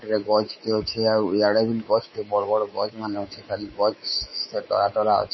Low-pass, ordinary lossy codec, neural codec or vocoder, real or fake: 7.2 kHz; MP3, 24 kbps; codec, 24 kHz, 3.1 kbps, DualCodec; fake